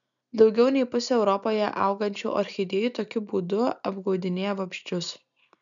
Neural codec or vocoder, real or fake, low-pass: none; real; 7.2 kHz